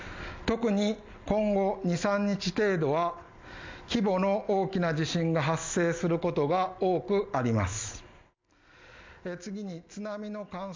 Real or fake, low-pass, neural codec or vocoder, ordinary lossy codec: real; 7.2 kHz; none; MP3, 64 kbps